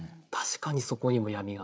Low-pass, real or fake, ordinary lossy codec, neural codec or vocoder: none; fake; none; codec, 16 kHz, 8 kbps, FreqCodec, larger model